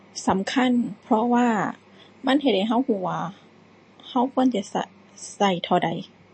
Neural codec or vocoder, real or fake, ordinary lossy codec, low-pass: none; real; MP3, 32 kbps; 9.9 kHz